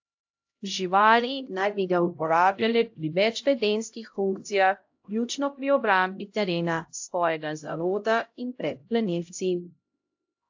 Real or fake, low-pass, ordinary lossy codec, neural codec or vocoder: fake; 7.2 kHz; AAC, 48 kbps; codec, 16 kHz, 0.5 kbps, X-Codec, HuBERT features, trained on LibriSpeech